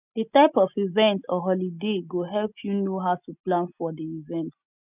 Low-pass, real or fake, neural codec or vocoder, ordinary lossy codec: 3.6 kHz; real; none; none